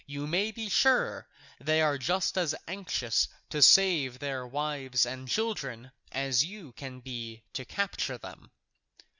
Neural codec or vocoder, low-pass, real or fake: none; 7.2 kHz; real